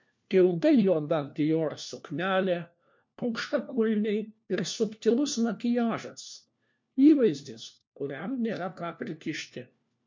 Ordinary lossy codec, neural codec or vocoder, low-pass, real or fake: MP3, 48 kbps; codec, 16 kHz, 1 kbps, FunCodec, trained on LibriTTS, 50 frames a second; 7.2 kHz; fake